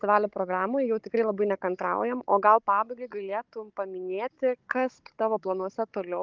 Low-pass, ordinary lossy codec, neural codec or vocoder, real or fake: 7.2 kHz; Opus, 24 kbps; codec, 16 kHz, 16 kbps, FunCodec, trained on Chinese and English, 50 frames a second; fake